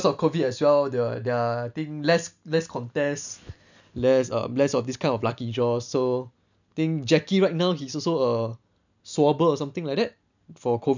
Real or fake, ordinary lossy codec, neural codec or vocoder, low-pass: real; none; none; 7.2 kHz